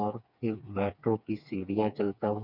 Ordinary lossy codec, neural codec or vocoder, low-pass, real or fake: none; codec, 16 kHz, 4 kbps, FreqCodec, smaller model; 5.4 kHz; fake